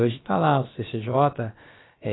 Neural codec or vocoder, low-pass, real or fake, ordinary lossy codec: codec, 16 kHz, about 1 kbps, DyCAST, with the encoder's durations; 7.2 kHz; fake; AAC, 16 kbps